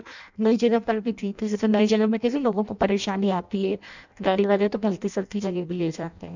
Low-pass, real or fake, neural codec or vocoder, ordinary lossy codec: 7.2 kHz; fake; codec, 16 kHz in and 24 kHz out, 0.6 kbps, FireRedTTS-2 codec; none